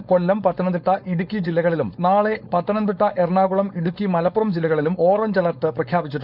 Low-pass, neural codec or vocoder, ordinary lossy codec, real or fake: 5.4 kHz; codec, 16 kHz, 4.8 kbps, FACodec; none; fake